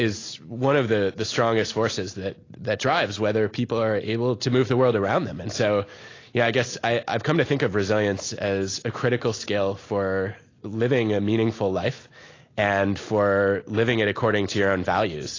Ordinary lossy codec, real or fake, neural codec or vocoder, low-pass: AAC, 32 kbps; real; none; 7.2 kHz